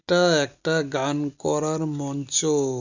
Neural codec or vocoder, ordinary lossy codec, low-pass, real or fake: none; none; 7.2 kHz; real